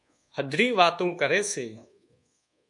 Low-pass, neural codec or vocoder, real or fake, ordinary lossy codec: 10.8 kHz; codec, 24 kHz, 1.2 kbps, DualCodec; fake; MP3, 96 kbps